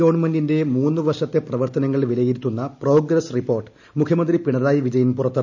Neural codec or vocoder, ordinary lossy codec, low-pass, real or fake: none; none; 7.2 kHz; real